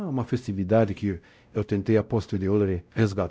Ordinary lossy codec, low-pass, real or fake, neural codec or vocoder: none; none; fake; codec, 16 kHz, 0.5 kbps, X-Codec, WavLM features, trained on Multilingual LibriSpeech